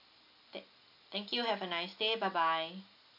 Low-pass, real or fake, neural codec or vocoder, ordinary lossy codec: 5.4 kHz; real; none; none